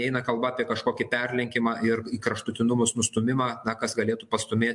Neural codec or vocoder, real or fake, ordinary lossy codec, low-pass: none; real; MP3, 64 kbps; 10.8 kHz